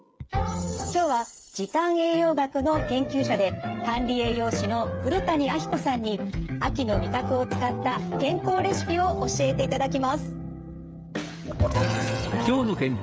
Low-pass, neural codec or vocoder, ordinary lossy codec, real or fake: none; codec, 16 kHz, 8 kbps, FreqCodec, smaller model; none; fake